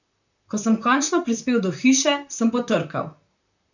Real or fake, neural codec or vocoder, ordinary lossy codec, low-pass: fake; vocoder, 44.1 kHz, 128 mel bands, Pupu-Vocoder; none; 7.2 kHz